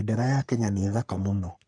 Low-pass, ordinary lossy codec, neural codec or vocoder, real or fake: 9.9 kHz; none; codec, 44.1 kHz, 3.4 kbps, Pupu-Codec; fake